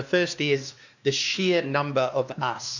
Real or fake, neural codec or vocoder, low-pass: fake; codec, 16 kHz, 2 kbps, X-Codec, WavLM features, trained on Multilingual LibriSpeech; 7.2 kHz